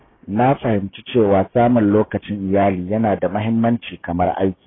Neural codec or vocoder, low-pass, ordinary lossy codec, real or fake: codec, 16 kHz, 6 kbps, DAC; 7.2 kHz; AAC, 16 kbps; fake